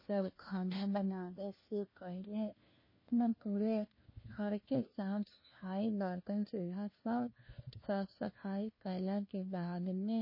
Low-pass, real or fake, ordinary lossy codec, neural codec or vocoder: 5.4 kHz; fake; MP3, 24 kbps; codec, 16 kHz, 1 kbps, FunCodec, trained on LibriTTS, 50 frames a second